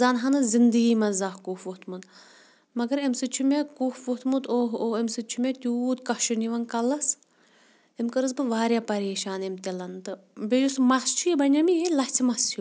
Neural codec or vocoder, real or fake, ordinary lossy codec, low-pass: none; real; none; none